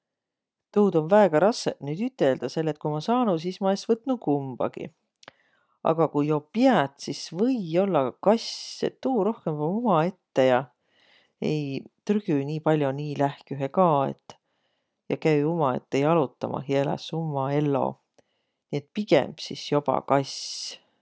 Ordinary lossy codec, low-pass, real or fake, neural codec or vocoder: none; none; real; none